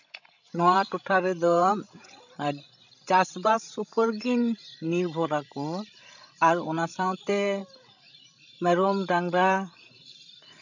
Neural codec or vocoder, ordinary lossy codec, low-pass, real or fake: codec, 16 kHz, 16 kbps, FreqCodec, larger model; none; 7.2 kHz; fake